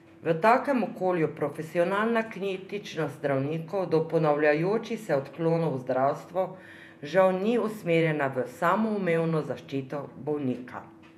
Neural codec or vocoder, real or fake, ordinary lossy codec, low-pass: vocoder, 48 kHz, 128 mel bands, Vocos; fake; none; 14.4 kHz